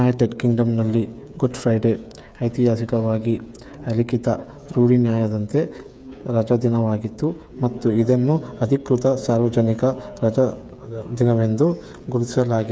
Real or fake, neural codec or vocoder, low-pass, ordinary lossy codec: fake; codec, 16 kHz, 8 kbps, FreqCodec, smaller model; none; none